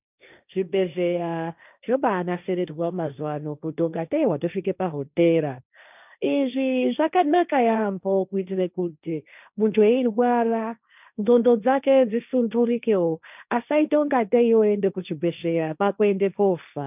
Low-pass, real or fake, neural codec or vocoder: 3.6 kHz; fake; codec, 16 kHz, 1.1 kbps, Voila-Tokenizer